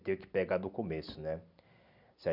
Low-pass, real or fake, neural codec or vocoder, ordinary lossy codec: 5.4 kHz; real; none; none